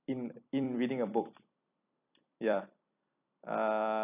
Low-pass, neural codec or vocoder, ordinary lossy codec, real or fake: 3.6 kHz; vocoder, 44.1 kHz, 128 mel bands every 256 samples, BigVGAN v2; none; fake